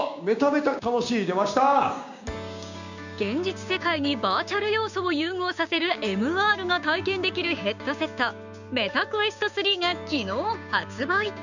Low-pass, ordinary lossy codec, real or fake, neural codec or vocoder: 7.2 kHz; none; fake; codec, 16 kHz, 6 kbps, DAC